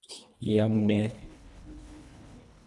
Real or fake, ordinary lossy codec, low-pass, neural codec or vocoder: fake; none; none; codec, 24 kHz, 1.5 kbps, HILCodec